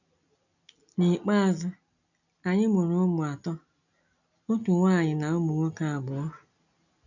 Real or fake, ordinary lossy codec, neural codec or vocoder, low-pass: real; none; none; 7.2 kHz